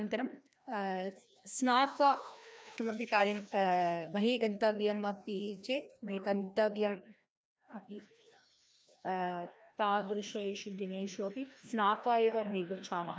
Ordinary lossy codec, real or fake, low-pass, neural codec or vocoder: none; fake; none; codec, 16 kHz, 1 kbps, FreqCodec, larger model